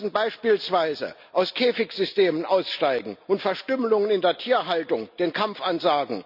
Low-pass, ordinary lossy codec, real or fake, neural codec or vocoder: 5.4 kHz; none; real; none